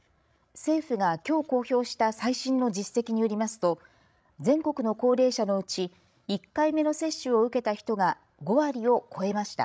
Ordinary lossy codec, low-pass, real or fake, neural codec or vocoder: none; none; fake; codec, 16 kHz, 16 kbps, FreqCodec, larger model